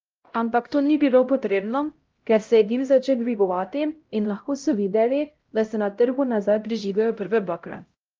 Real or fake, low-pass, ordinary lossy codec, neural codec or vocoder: fake; 7.2 kHz; Opus, 24 kbps; codec, 16 kHz, 0.5 kbps, X-Codec, HuBERT features, trained on LibriSpeech